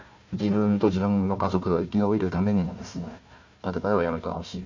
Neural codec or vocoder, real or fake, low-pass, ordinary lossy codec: codec, 16 kHz, 1 kbps, FunCodec, trained on Chinese and English, 50 frames a second; fake; 7.2 kHz; MP3, 48 kbps